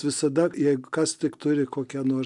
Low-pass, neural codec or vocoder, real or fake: 10.8 kHz; none; real